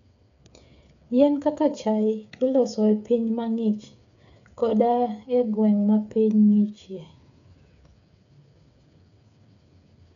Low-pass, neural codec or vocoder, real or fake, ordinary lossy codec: 7.2 kHz; codec, 16 kHz, 8 kbps, FreqCodec, smaller model; fake; none